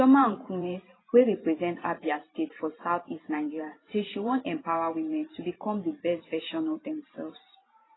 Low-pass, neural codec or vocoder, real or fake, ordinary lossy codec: 7.2 kHz; vocoder, 44.1 kHz, 128 mel bands every 512 samples, BigVGAN v2; fake; AAC, 16 kbps